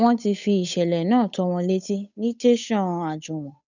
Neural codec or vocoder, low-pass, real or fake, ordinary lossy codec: codec, 16 kHz, 8 kbps, FunCodec, trained on Chinese and English, 25 frames a second; 7.2 kHz; fake; none